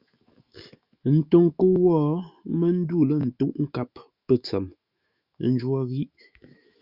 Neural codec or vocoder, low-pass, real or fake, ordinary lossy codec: autoencoder, 48 kHz, 128 numbers a frame, DAC-VAE, trained on Japanese speech; 5.4 kHz; fake; Opus, 64 kbps